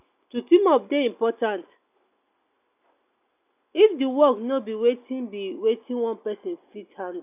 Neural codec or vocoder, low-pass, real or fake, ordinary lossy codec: none; 3.6 kHz; real; none